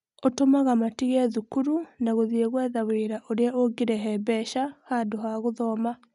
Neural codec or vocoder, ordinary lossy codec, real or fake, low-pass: none; none; real; 10.8 kHz